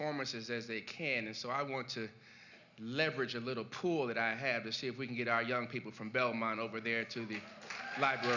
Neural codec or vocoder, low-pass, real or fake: none; 7.2 kHz; real